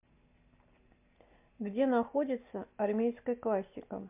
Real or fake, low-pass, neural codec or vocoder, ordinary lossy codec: real; 3.6 kHz; none; MP3, 32 kbps